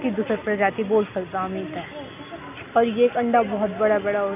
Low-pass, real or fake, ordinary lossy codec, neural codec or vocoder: 3.6 kHz; real; none; none